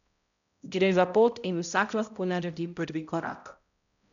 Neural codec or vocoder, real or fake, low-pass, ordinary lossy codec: codec, 16 kHz, 0.5 kbps, X-Codec, HuBERT features, trained on balanced general audio; fake; 7.2 kHz; none